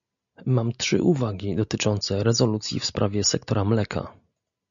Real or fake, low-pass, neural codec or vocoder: real; 7.2 kHz; none